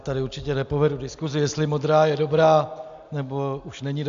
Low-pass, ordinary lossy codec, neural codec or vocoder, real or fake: 7.2 kHz; AAC, 64 kbps; none; real